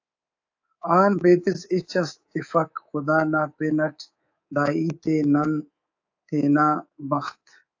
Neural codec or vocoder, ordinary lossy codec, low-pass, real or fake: codec, 24 kHz, 3.1 kbps, DualCodec; AAC, 48 kbps; 7.2 kHz; fake